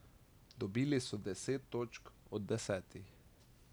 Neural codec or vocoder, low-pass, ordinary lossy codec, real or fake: none; none; none; real